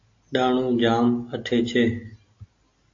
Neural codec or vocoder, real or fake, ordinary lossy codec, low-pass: none; real; AAC, 48 kbps; 7.2 kHz